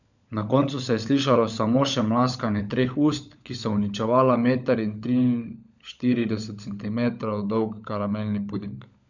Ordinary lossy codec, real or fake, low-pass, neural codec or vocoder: none; fake; 7.2 kHz; codec, 16 kHz, 16 kbps, FunCodec, trained on LibriTTS, 50 frames a second